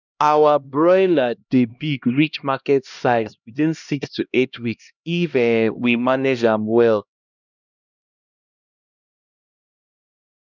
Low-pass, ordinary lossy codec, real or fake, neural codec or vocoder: 7.2 kHz; none; fake; codec, 16 kHz, 1 kbps, X-Codec, HuBERT features, trained on LibriSpeech